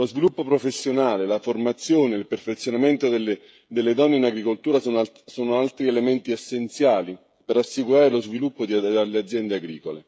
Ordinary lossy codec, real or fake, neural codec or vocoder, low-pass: none; fake; codec, 16 kHz, 16 kbps, FreqCodec, smaller model; none